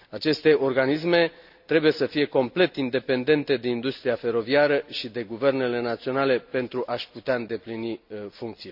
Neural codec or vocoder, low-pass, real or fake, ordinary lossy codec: none; 5.4 kHz; real; none